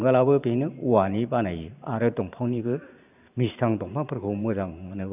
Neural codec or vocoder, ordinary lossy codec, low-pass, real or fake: vocoder, 44.1 kHz, 128 mel bands every 512 samples, BigVGAN v2; none; 3.6 kHz; fake